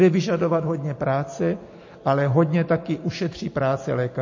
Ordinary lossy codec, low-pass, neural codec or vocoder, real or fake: MP3, 32 kbps; 7.2 kHz; none; real